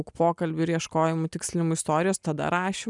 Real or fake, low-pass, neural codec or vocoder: real; 10.8 kHz; none